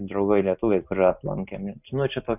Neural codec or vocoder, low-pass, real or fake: none; 3.6 kHz; real